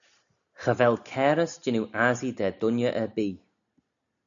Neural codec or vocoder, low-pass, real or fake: none; 7.2 kHz; real